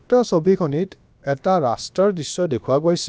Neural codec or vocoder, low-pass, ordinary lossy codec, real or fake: codec, 16 kHz, about 1 kbps, DyCAST, with the encoder's durations; none; none; fake